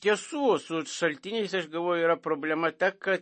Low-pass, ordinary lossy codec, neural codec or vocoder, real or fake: 10.8 kHz; MP3, 32 kbps; none; real